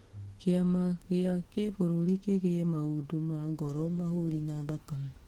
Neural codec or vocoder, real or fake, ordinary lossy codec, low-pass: autoencoder, 48 kHz, 32 numbers a frame, DAC-VAE, trained on Japanese speech; fake; Opus, 16 kbps; 19.8 kHz